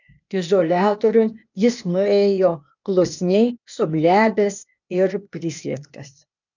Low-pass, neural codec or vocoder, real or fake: 7.2 kHz; codec, 16 kHz, 0.8 kbps, ZipCodec; fake